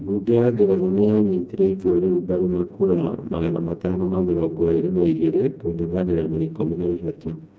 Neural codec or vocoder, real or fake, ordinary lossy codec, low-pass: codec, 16 kHz, 1 kbps, FreqCodec, smaller model; fake; none; none